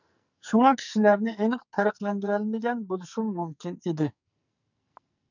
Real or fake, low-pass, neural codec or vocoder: fake; 7.2 kHz; codec, 44.1 kHz, 2.6 kbps, SNAC